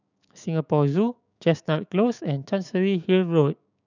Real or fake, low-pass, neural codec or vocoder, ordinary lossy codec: fake; 7.2 kHz; codec, 16 kHz, 6 kbps, DAC; none